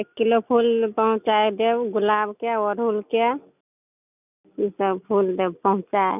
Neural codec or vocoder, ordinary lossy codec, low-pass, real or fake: none; none; 3.6 kHz; real